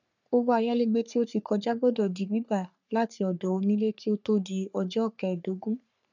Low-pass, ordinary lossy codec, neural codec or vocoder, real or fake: 7.2 kHz; none; codec, 44.1 kHz, 3.4 kbps, Pupu-Codec; fake